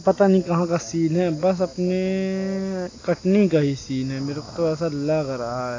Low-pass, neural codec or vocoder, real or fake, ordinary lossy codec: 7.2 kHz; none; real; AAC, 32 kbps